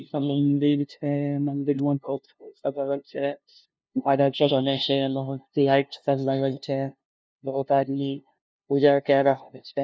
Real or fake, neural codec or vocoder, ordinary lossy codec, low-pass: fake; codec, 16 kHz, 0.5 kbps, FunCodec, trained on LibriTTS, 25 frames a second; none; none